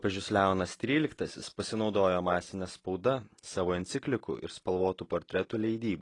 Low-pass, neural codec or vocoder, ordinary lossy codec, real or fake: 10.8 kHz; none; AAC, 32 kbps; real